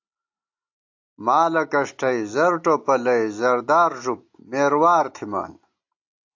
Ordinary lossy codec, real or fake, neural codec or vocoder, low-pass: AAC, 48 kbps; real; none; 7.2 kHz